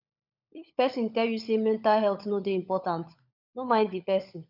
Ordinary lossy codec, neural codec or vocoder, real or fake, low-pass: none; codec, 16 kHz, 16 kbps, FunCodec, trained on LibriTTS, 50 frames a second; fake; 5.4 kHz